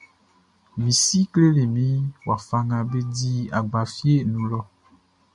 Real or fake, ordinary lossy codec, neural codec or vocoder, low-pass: real; MP3, 96 kbps; none; 10.8 kHz